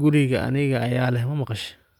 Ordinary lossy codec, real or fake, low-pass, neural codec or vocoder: none; real; 19.8 kHz; none